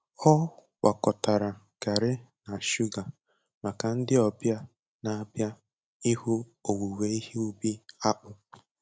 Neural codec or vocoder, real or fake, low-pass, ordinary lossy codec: none; real; none; none